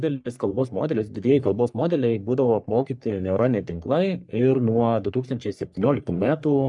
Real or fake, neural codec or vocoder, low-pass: fake; codec, 44.1 kHz, 3.4 kbps, Pupu-Codec; 10.8 kHz